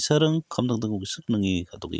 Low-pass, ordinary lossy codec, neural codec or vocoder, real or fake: none; none; none; real